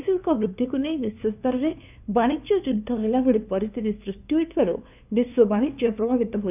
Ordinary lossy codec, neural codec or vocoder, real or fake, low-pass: none; codec, 16 kHz, 2 kbps, FunCodec, trained on LibriTTS, 25 frames a second; fake; 3.6 kHz